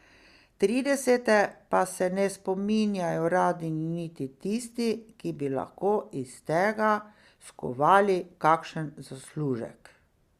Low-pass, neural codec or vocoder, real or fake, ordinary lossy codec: 14.4 kHz; none; real; Opus, 64 kbps